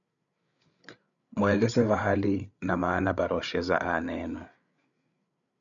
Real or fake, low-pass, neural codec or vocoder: fake; 7.2 kHz; codec, 16 kHz, 8 kbps, FreqCodec, larger model